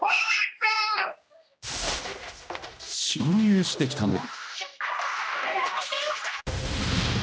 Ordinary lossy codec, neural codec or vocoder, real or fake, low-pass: none; codec, 16 kHz, 1 kbps, X-Codec, HuBERT features, trained on general audio; fake; none